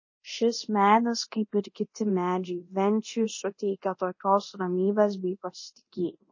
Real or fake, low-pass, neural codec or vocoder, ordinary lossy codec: fake; 7.2 kHz; codec, 24 kHz, 0.9 kbps, DualCodec; MP3, 32 kbps